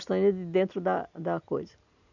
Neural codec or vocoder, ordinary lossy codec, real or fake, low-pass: none; none; real; 7.2 kHz